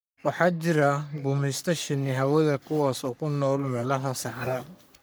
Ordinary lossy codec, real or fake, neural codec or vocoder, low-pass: none; fake; codec, 44.1 kHz, 3.4 kbps, Pupu-Codec; none